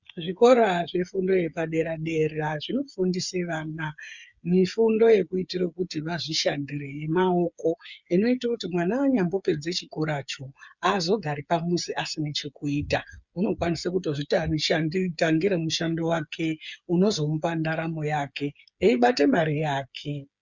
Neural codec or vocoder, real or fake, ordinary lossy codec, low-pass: codec, 16 kHz, 8 kbps, FreqCodec, smaller model; fake; Opus, 64 kbps; 7.2 kHz